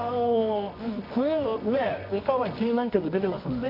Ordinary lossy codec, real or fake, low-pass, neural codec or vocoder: none; fake; 5.4 kHz; codec, 24 kHz, 0.9 kbps, WavTokenizer, medium music audio release